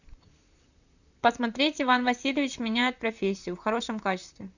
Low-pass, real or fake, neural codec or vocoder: 7.2 kHz; fake; vocoder, 44.1 kHz, 128 mel bands, Pupu-Vocoder